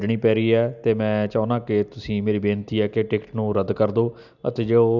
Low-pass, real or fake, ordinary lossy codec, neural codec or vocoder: 7.2 kHz; real; none; none